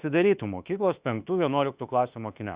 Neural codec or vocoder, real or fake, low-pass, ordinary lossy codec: autoencoder, 48 kHz, 32 numbers a frame, DAC-VAE, trained on Japanese speech; fake; 3.6 kHz; Opus, 64 kbps